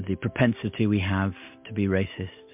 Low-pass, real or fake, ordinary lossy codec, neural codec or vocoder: 3.6 kHz; real; MP3, 32 kbps; none